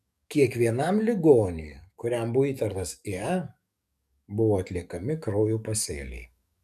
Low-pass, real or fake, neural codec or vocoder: 14.4 kHz; fake; codec, 44.1 kHz, 7.8 kbps, DAC